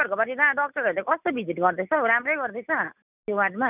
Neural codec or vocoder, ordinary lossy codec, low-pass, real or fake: none; none; 3.6 kHz; real